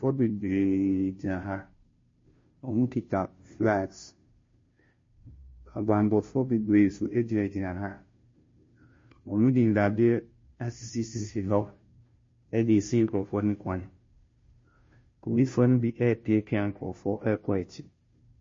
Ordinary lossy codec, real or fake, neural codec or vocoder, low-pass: MP3, 32 kbps; fake; codec, 16 kHz, 0.5 kbps, FunCodec, trained on Chinese and English, 25 frames a second; 7.2 kHz